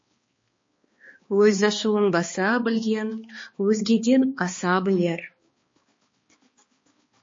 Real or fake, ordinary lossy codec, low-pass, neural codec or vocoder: fake; MP3, 32 kbps; 7.2 kHz; codec, 16 kHz, 2 kbps, X-Codec, HuBERT features, trained on balanced general audio